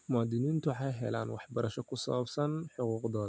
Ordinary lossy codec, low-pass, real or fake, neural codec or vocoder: none; none; real; none